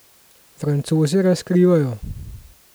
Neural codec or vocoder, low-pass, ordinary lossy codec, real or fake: none; none; none; real